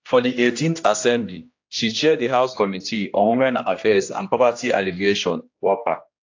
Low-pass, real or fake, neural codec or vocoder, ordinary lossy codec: 7.2 kHz; fake; codec, 16 kHz, 1 kbps, X-Codec, HuBERT features, trained on general audio; AAC, 48 kbps